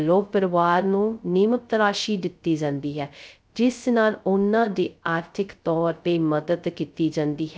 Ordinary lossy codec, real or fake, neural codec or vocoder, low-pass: none; fake; codec, 16 kHz, 0.2 kbps, FocalCodec; none